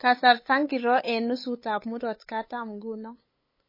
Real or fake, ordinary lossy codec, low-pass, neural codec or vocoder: fake; MP3, 24 kbps; 5.4 kHz; codec, 16 kHz, 8 kbps, FunCodec, trained on LibriTTS, 25 frames a second